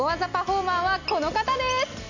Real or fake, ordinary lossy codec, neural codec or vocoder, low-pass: real; none; none; 7.2 kHz